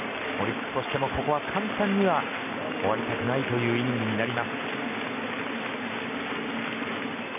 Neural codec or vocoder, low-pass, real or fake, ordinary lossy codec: none; 3.6 kHz; real; none